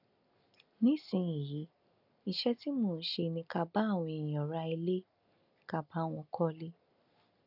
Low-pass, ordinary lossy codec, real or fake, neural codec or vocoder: 5.4 kHz; none; real; none